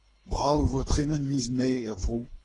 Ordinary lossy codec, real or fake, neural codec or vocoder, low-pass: AAC, 32 kbps; fake; codec, 24 kHz, 3 kbps, HILCodec; 10.8 kHz